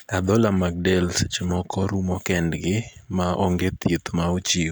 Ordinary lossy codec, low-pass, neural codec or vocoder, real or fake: none; none; none; real